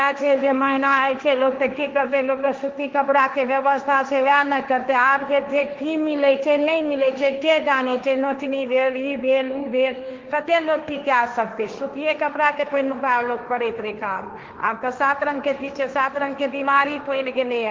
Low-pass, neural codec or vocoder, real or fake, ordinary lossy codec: 7.2 kHz; codec, 16 kHz, 1.1 kbps, Voila-Tokenizer; fake; Opus, 32 kbps